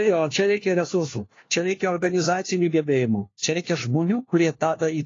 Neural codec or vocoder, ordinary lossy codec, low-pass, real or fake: codec, 16 kHz, 1 kbps, FunCodec, trained on LibriTTS, 50 frames a second; AAC, 32 kbps; 7.2 kHz; fake